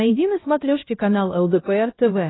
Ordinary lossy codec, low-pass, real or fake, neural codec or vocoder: AAC, 16 kbps; 7.2 kHz; fake; codec, 16 kHz, 1 kbps, X-Codec, HuBERT features, trained on LibriSpeech